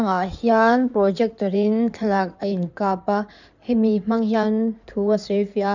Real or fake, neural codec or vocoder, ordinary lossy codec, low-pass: fake; codec, 16 kHz in and 24 kHz out, 2.2 kbps, FireRedTTS-2 codec; none; 7.2 kHz